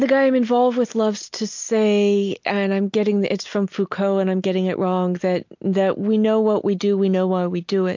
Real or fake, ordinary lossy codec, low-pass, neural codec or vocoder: real; MP3, 48 kbps; 7.2 kHz; none